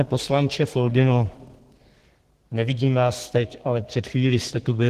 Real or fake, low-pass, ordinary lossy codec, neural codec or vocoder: fake; 14.4 kHz; Opus, 16 kbps; codec, 32 kHz, 1.9 kbps, SNAC